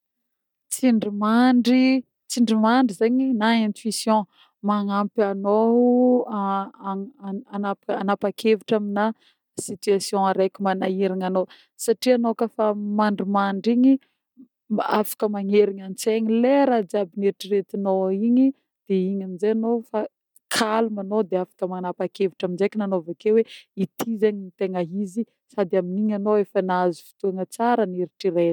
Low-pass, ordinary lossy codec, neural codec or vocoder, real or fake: 19.8 kHz; none; none; real